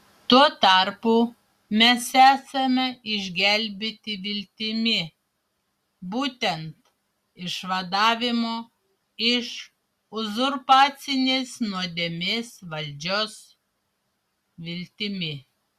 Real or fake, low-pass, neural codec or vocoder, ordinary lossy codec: real; 14.4 kHz; none; Opus, 64 kbps